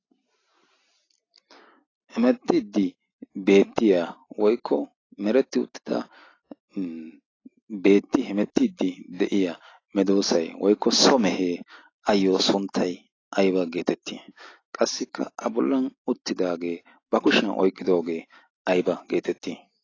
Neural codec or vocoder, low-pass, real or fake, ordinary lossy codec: none; 7.2 kHz; real; AAC, 32 kbps